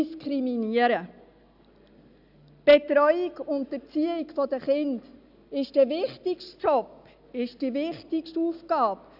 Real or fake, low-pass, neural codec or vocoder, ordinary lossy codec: real; 5.4 kHz; none; none